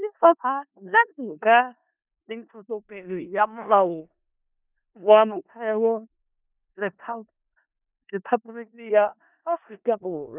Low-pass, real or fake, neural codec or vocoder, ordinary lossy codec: 3.6 kHz; fake; codec, 16 kHz in and 24 kHz out, 0.4 kbps, LongCat-Audio-Codec, four codebook decoder; none